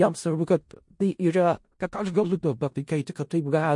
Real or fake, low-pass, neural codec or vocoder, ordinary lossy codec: fake; 10.8 kHz; codec, 16 kHz in and 24 kHz out, 0.4 kbps, LongCat-Audio-Codec, four codebook decoder; MP3, 48 kbps